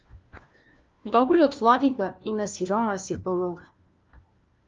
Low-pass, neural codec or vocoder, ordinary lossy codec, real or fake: 7.2 kHz; codec, 16 kHz, 1 kbps, FunCodec, trained on LibriTTS, 50 frames a second; Opus, 32 kbps; fake